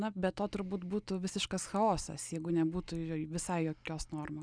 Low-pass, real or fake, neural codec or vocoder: 9.9 kHz; real; none